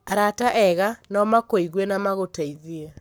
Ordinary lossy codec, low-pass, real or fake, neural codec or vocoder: none; none; fake; codec, 44.1 kHz, 7.8 kbps, Pupu-Codec